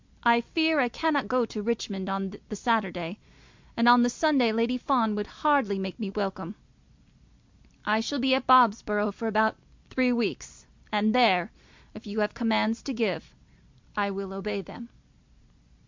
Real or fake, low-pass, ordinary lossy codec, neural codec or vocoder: real; 7.2 kHz; MP3, 64 kbps; none